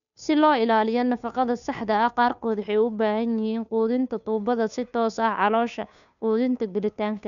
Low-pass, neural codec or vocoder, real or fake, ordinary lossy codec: 7.2 kHz; codec, 16 kHz, 2 kbps, FunCodec, trained on Chinese and English, 25 frames a second; fake; none